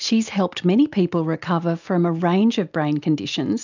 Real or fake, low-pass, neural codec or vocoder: real; 7.2 kHz; none